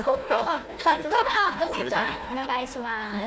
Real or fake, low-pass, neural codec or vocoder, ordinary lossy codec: fake; none; codec, 16 kHz, 1 kbps, FunCodec, trained on Chinese and English, 50 frames a second; none